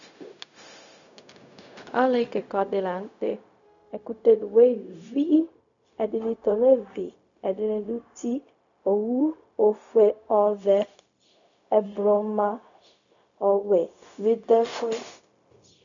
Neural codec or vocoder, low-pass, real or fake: codec, 16 kHz, 0.4 kbps, LongCat-Audio-Codec; 7.2 kHz; fake